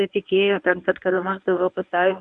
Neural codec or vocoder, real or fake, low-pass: codec, 24 kHz, 0.9 kbps, WavTokenizer, medium speech release version 1; fake; 10.8 kHz